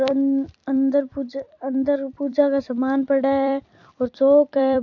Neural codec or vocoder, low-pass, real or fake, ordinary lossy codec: vocoder, 44.1 kHz, 128 mel bands every 256 samples, BigVGAN v2; 7.2 kHz; fake; AAC, 48 kbps